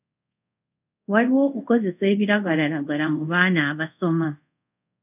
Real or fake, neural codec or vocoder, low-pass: fake; codec, 24 kHz, 0.5 kbps, DualCodec; 3.6 kHz